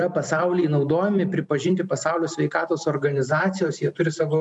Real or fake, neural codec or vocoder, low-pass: real; none; 10.8 kHz